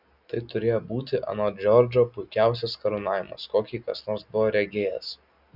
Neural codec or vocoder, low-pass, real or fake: none; 5.4 kHz; real